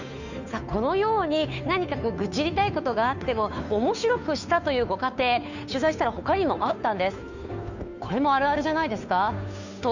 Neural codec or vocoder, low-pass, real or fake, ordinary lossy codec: codec, 16 kHz, 2 kbps, FunCodec, trained on Chinese and English, 25 frames a second; 7.2 kHz; fake; none